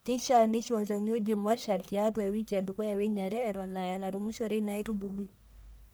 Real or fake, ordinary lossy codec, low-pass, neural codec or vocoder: fake; none; none; codec, 44.1 kHz, 1.7 kbps, Pupu-Codec